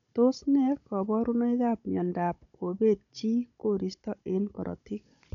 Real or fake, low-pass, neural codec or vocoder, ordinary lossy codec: fake; 7.2 kHz; codec, 16 kHz, 16 kbps, FunCodec, trained on Chinese and English, 50 frames a second; none